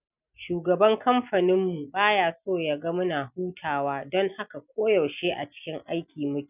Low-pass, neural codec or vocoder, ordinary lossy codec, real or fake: 3.6 kHz; none; none; real